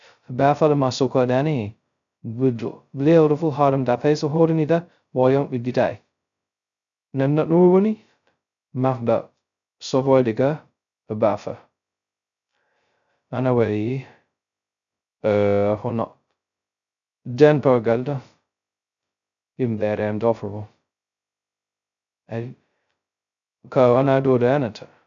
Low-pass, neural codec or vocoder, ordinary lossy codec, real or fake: 7.2 kHz; codec, 16 kHz, 0.2 kbps, FocalCodec; none; fake